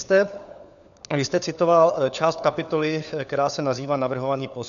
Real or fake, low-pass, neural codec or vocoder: fake; 7.2 kHz; codec, 16 kHz, 4 kbps, FunCodec, trained on LibriTTS, 50 frames a second